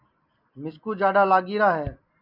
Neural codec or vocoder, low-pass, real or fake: none; 5.4 kHz; real